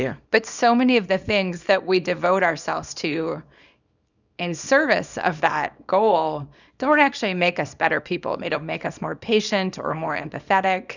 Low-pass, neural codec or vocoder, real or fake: 7.2 kHz; codec, 24 kHz, 0.9 kbps, WavTokenizer, small release; fake